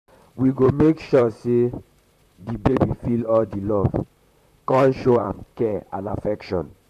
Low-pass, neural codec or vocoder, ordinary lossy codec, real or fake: 14.4 kHz; vocoder, 44.1 kHz, 128 mel bands, Pupu-Vocoder; none; fake